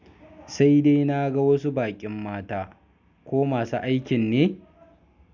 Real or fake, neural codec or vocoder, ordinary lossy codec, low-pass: real; none; none; 7.2 kHz